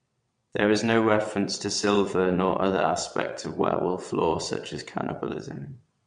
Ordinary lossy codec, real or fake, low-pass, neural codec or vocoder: MP3, 64 kbps; fake; 9.9 kHz; vocoder, 22.05 kHz, 80 mel bands, WaveNeXt